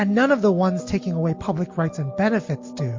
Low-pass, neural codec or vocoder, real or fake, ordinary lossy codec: 7.2 kHz; none; real; MP3, 48 kbps